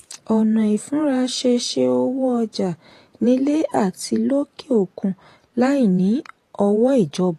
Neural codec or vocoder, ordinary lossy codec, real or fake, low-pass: vocoder, 48 kHz, 128 mel bands, Vocos; AAC, 48 kbps; fake; 14.4 kHz